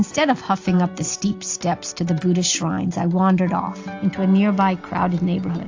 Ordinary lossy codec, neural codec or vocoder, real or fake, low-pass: AAC, 48 kbps; none; real; 7.2 kHz